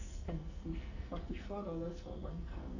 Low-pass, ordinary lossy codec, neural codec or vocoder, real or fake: 7.2 kHz; Opus, 64 kbps; codec, 44.1 kHz, 2.6 kbps, SNAC; fake